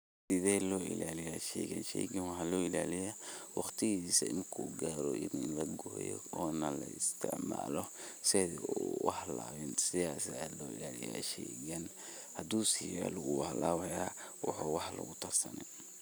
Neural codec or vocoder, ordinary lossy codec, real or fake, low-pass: none; none; real; none